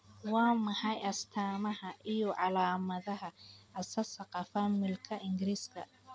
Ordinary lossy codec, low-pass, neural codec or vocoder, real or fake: none; none; none; real